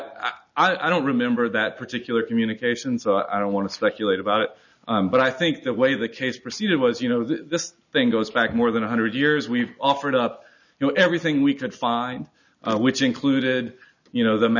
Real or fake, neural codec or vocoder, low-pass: real; none; 7.2 kHz